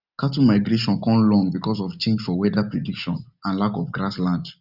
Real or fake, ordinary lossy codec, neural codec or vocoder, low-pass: real; none; none; 5.4 kHz